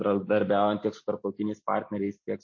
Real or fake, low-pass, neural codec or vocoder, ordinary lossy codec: real; 7.2 kHz; none; MP3, 32 kbps